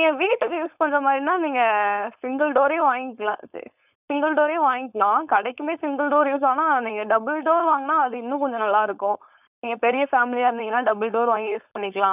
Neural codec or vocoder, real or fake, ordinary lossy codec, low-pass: codec, 16 kHz, 4.8 kbps, FACodec; fake; none; 3.6 kHz